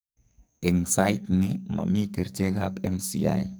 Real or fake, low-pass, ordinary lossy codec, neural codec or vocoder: fake; none; none; codec, 44.1 kHz, 2.6 kbps, SNAC